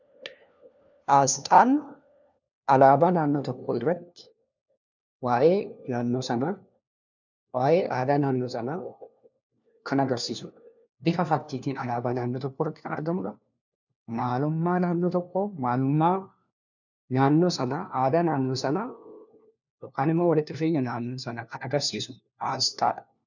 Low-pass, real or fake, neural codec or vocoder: 7.2 kHz; fake; codec, 16 kHz, 1 kbps, FunCodec, trained on LibriTTS, 50 frames a second